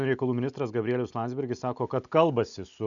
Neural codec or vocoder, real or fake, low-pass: none; real; 7.2 kHz